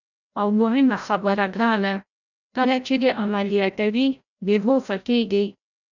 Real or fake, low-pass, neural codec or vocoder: fake; 7.2 kHz; codec, 16 kHz, 0.5 kbps, FreqCodec, larger model